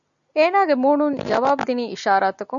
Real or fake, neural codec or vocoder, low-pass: real; none; 7.2 kHz